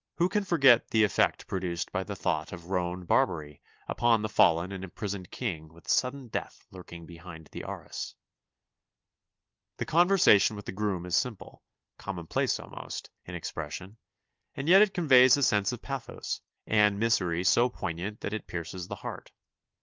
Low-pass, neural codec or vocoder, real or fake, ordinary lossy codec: 7.2 kHz; none; real; Opus, 32 kbps